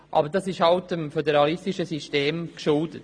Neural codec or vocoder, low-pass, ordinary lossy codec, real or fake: none; 9.9 kHz; MP3, 64 kbps; real